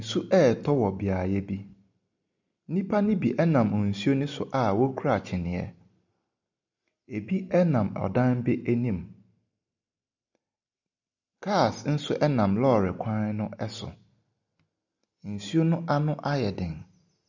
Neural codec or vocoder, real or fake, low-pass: none; real; 7.2 kHz